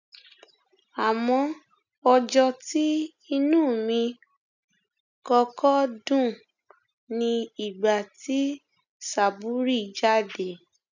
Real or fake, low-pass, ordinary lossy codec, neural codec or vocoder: real; 7.2 kHz; none; none